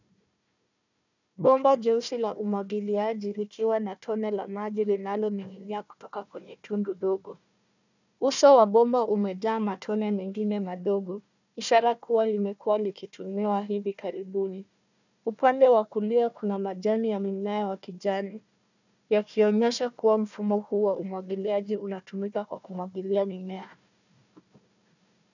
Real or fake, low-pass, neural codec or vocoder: fake; 7.2 kHz; codec, 16 kHz, 1 kbps, FunCodec, trained on Chinese and English, 50 frames a second